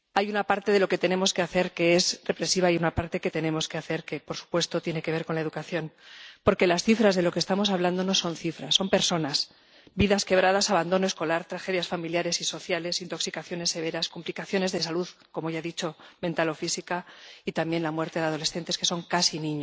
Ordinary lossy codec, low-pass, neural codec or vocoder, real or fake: none; none; none; real